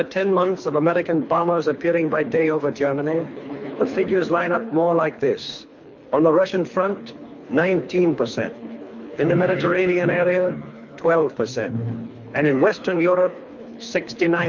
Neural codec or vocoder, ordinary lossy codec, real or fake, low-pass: codec, 24 kHz, 3 kbps, HILCodec; MP3, 48 kbps; fake; 7.2 kHz